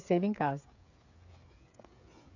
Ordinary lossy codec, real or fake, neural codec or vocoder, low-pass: none; fake; codec, 16 kHz, 8 kbps, FreqCodec, smaller model; 7.2 kHz